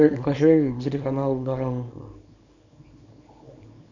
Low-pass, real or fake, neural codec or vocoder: 7.2 kHz; fake; codec, 24 kHz, 0.9 kbps, WavTokenizer, small release